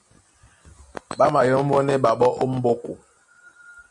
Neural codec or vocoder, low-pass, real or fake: none; 10.8 kHz; real